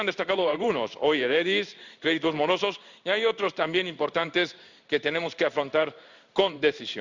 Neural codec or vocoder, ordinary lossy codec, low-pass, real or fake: codec, 16 kHz in and 24 kHz out, 1 kbps, XY-Tokenizer; Opus, 64 kbps; 7.2 kHz; fake